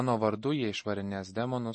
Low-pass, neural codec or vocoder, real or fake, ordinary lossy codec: 9.9 kHz; none; real; MP3, 32 kbps